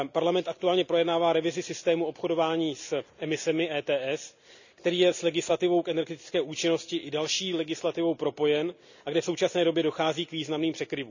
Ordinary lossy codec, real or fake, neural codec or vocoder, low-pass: none; real; none; 7.2 kHz